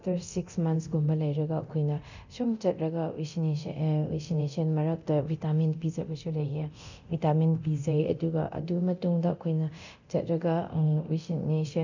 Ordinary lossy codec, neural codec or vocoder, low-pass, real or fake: none; codec, 24 kHz, 0.9 kbps, DualCodec; 7.2 kHz; fake